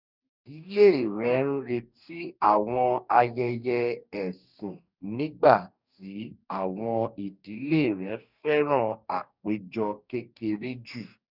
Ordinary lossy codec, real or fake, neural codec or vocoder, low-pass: none; fake; codec, 44.1 kHz, 2.6 kbps, DAC; 5.4 kHz